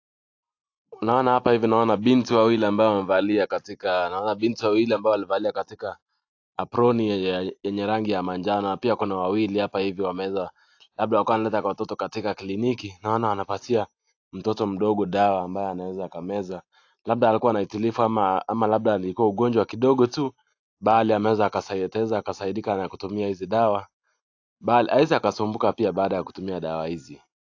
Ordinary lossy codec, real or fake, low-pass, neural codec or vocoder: AAC, 48 kbps; real; 7.2 kHz; none